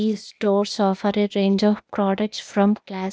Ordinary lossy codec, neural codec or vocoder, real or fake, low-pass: none; codec, 16 kHz, 0.8 kbps, ZipCodec; fake; none